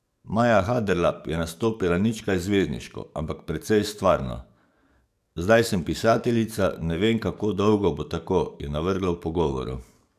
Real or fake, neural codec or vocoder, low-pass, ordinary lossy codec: fake; codec, 44.1 kHz, 7.8 kbps, DAC; 14.4 kHz; none